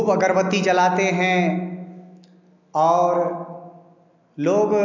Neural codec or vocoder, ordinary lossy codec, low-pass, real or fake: none; none; 7.2 kHz; real